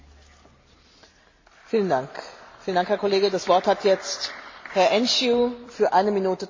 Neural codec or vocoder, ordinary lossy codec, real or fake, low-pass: none; MP3, 32 kbps; real; 7.2 kHz